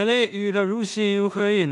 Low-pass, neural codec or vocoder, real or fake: 10.8 kHz; codec, 16 kHz in and 24 kHz out, 0.4 kbps, LongCat-Audio-Codec, two codebook decoder; fake